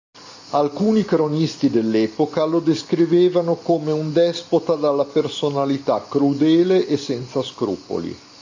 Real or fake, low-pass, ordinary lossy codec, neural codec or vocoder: real; 7.2 kHz; AAC, 48 kbps; none